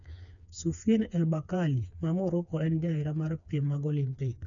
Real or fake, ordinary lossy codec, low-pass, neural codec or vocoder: fake; none; 7.2 kHz; codec, 16 kHz, 4 kbps, FreqCodec, smaller model